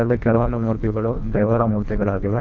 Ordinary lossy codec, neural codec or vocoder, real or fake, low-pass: none; codec, 24 kHz, 1.5 kbps, HILCodec; fake; 7.2 kHz